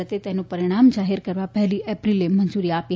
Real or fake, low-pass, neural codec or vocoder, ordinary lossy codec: real; none; none; none